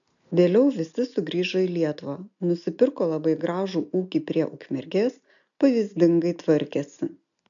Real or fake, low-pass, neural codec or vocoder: real; 7.2 kHz; none